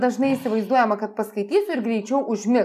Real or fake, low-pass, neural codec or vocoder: real; 14.4 kHz; none